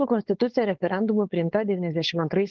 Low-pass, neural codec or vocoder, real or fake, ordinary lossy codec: 7.2 kHz; codec, 16 kHz, 4.8 kbps, FACodec; fake; Opus, 16 kbps